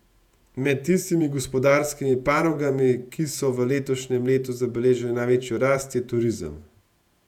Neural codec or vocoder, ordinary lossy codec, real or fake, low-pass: vocoder, 48 kHz, 128 mel bands, Vocos; none; fake; 19.8 kHz